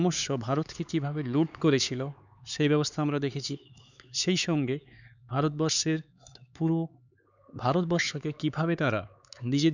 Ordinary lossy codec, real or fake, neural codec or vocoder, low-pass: none; fake; codec, 16 kHz, 4 kbps, X-Codec, HuBERT features, trained on LibriSpeech; 7.2 kHz